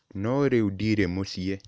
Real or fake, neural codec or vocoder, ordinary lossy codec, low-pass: real; none; none; none